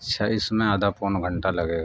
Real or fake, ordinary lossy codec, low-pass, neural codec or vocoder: real; none; none; none